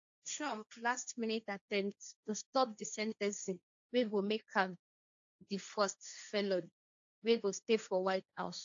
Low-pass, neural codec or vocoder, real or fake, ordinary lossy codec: 7.2 kHz; codec, 16 kHz, 1.1 kbps, Voila-Tokenizer; fake; AAC, 64 kbps